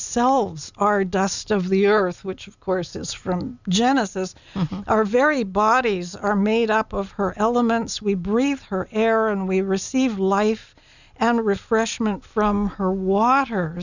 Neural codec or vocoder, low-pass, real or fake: none; 7.2 kHz; real